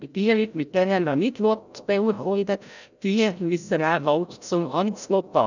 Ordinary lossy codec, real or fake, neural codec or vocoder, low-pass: none; fake; codec, 16 kHz, 0.5 kbps, FreqCodec, larger model; 7.2 kHz